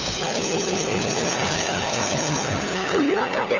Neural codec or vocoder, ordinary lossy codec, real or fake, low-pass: codec, 16 kHz, 4 kbps, FunCodec, trained on LibriTTS, 50 frames a second; Opus, 64 kbps; fake; 7.2 kHz